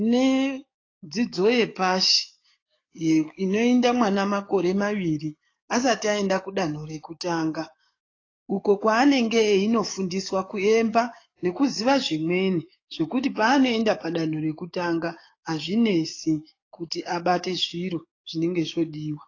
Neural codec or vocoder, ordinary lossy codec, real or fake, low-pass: codec, 44.1 kHz, 7.8 kbps, DAC; AAC, 32 kbps; fake; 7.2 kHz